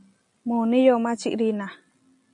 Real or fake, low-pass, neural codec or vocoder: real; 10.8 kHz; none